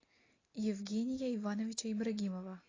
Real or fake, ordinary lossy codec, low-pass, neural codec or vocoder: real; AAC, 32 kbps; 7.2 kHz; none